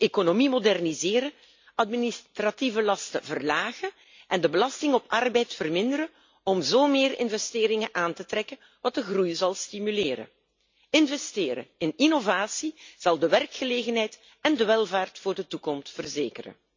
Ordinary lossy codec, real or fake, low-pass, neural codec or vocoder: MP3, 64 kbps; real; 7.2 kHz; none